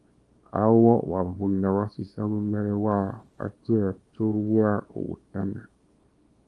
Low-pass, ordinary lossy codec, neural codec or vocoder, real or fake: 10.8 kHz; Opus, 32 kbps; codec, 24 kHz, 0.9 kbps, WavTokenizer, small release; fake